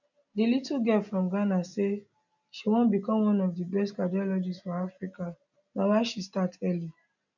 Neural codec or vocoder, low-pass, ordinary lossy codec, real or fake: none; 7.2 kHz; none; real